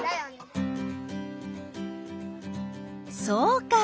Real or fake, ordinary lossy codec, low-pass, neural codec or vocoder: real; none; none; none